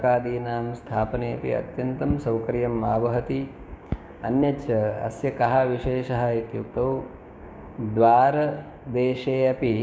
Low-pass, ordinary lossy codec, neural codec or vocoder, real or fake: none; none; codec, 16 kHz, 6 kbps, DAC; fake